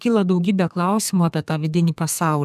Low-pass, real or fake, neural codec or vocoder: 14.4 kHz; fake; codec, 32 kHz, 1.9 kbps, SNAC